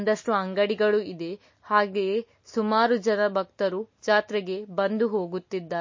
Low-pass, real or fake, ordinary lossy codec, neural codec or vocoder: 7.2 kHz; real; MP3, 32 kbps; none